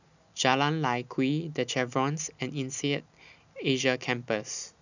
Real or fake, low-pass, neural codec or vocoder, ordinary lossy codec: real; 7.2 kHz; none; none